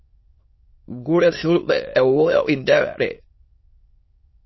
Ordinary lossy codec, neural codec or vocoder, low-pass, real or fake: MP3, 24 kbps; autoencoder, 22.05 kHz, a latent of 192 numbers a frame, VITS, trained on many speakers; 7.2 kHz; fake